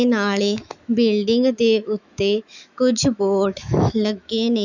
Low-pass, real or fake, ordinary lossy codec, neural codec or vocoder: 7.2 kHz; fake; none; autoencoder, 48 kHz, 128 numbers a frame, DAC-VAE, trained on Japanese speech